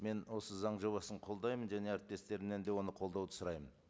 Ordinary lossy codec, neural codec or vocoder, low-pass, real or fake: none; none; none; real